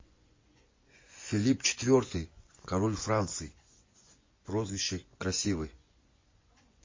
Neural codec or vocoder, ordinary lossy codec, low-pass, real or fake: vocoder, 24 kHz, 100 mel bands, Vocos; MP3, 32 kbps; 7.2 kHz; fake